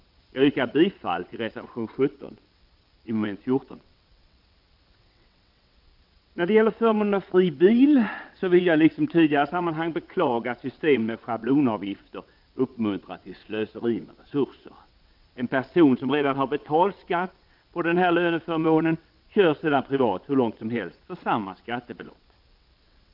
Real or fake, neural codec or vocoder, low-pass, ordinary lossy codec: fake; vocoder, 22.05 kHz, 80 mel bands, WaveNeXt; 5.4 kHz; Opus, 24 kbps